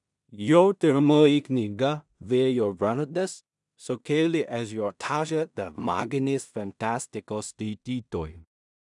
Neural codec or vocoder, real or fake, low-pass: codec, 16 kHz in and 24 kHz out, 0.4 kbps, LongCat-Audio-Codec, two codebook decoder; fake; 10.8 kHz